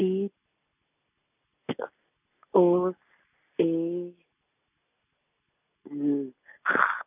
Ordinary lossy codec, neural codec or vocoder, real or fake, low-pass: none; none; real; 3.6 kHz